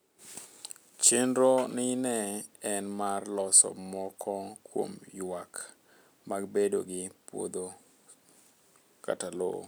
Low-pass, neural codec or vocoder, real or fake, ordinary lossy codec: none; none; real; none